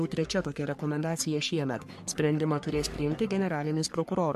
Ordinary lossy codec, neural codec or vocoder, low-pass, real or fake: MP3, 64 kbps; codec, 44.1 kHz, 3.4 kbps, Pupu-Codec; 14.4 kHz; fake